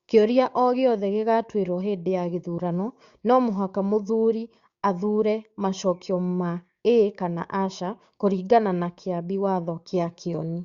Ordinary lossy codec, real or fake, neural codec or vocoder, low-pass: Opus, 64 kbps; fake; codec, 16 kHz, 6 kbps, DAC; 7.2 kHz